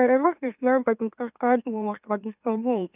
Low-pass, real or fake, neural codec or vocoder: 3.6 kHz; fake; autoencoder, 44.1 kHz, a latent of 192 numbers a frame, MeloTTS